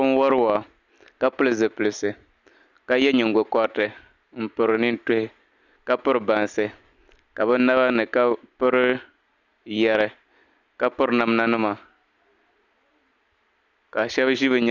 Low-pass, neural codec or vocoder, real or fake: 7.2 kHz; none; real